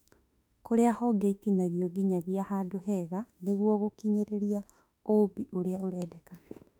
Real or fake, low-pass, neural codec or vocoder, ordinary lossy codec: fake; 19.8 kHz; autoencoder, 48 kHz, 32 numbers a frame, DAC-VAE, trained on Japanese speech; none